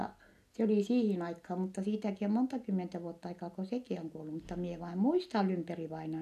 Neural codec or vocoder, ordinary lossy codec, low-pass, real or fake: none; none; 14.4 kHz; real